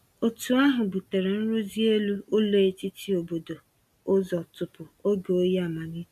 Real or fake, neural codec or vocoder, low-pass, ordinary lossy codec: real; none; 14.4 kHz; MP3, 96 kbps